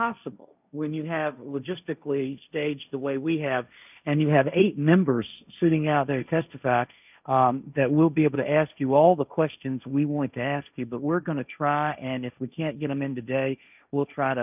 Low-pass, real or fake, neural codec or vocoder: 3.6 kHz; fake; codec, 16 kHz, 1.1 kbps, Voila-Tokenizer